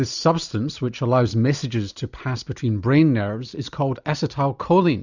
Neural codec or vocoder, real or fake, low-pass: none; real; 7.2 kHz